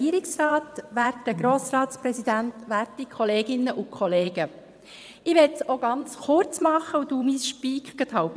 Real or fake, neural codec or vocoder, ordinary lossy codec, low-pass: fake; vocoder, 22.05 kHz, 80 mel bands, WaveNeXt; none; none